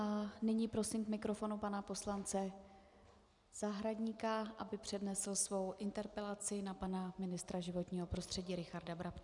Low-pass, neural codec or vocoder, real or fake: 10.8 kHz; none; real